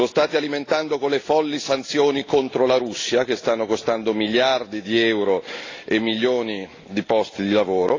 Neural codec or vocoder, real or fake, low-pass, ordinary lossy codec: none; real; 7.2 kHz; AAC, 32 kbps